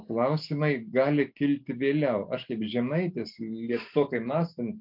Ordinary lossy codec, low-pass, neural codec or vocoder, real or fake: MP3, 48 kbps; 5.4 kHz; none; real